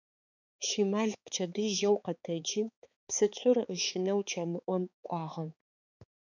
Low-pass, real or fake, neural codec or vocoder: 7.2 kHz; fake; codec, 16 kHz, 4 kbps, X-Codec, HuBERT features, trained on balanced general audio